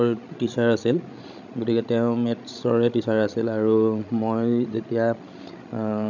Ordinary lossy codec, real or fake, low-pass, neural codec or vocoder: none; fake; 7.2 kHz; codec, 16 kHz, 16 kbps, FreqCodec, larger model